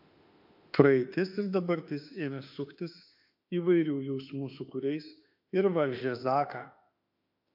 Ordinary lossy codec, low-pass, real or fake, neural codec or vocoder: AAC, 48 kbps; 5.4 kHz; fake; autoencoder, 48 kHz, 32 numbers a frame, DAC-VAE, trained on Japanese speech